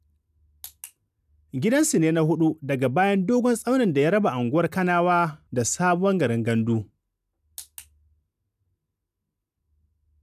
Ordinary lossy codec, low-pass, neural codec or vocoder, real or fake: none; 14.4 kHz; none; real